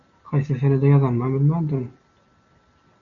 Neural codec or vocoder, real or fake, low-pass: none; real; 7.2 kHz